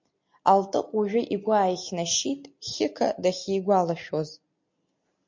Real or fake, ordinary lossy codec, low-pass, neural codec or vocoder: real; MP3, 48 kbps; 7.2 kHz; none